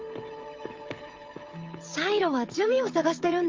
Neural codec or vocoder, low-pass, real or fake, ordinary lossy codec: codec, 16 kHz, 16 kbps, FreqCodec, smaller model; 7.2 kHz; fake; Opus, 24 kbps